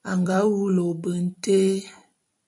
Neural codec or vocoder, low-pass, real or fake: vocoder, 44.1 kHz, 128 mel bands every 256 samples, BigVGAN v2; 10.8 kHz; fake